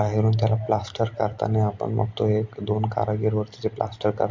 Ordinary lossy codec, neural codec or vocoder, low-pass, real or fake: MP3, 48 kbps; none; 7.2 kHz; real